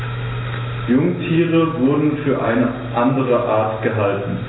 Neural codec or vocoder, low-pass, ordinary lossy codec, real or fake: none; 7.2 kHz; AAC, 16 kbps; real